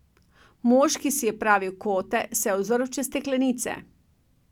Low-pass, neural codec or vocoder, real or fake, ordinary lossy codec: 19.8 kHz; none; real; none